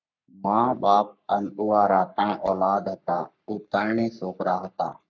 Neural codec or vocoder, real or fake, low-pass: codec, 44.1 kHz, 3.4 kbps, Pupu-Codec; fake; 7.2 kHz